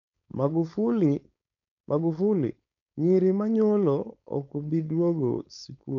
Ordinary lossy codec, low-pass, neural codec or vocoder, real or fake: none; 7.2 kHz; codec, 16 kHz, 4.8 kbps, FACodec; fake